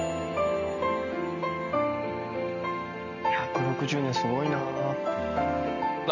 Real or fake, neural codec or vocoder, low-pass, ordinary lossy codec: real; none; 7.2 kHz; none